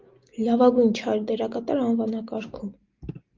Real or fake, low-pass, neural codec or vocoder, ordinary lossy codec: real; 7.2 kHz; none; Opus, 32 kbps